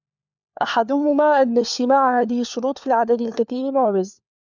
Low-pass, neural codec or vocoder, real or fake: 7.2 kHz; codec, 16 kHz, 4 kbps, FunCodec, trained on LibriTTS, 50 frames a second; fake